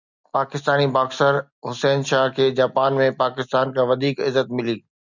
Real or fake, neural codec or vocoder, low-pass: real; none; 7.2 kHz